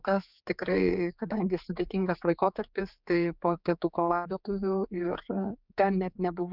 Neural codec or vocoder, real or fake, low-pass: codec, 16 kHz, 4 kbps, X-Codec, HuBERT features, trained on general audio; fake; 5.4 kHz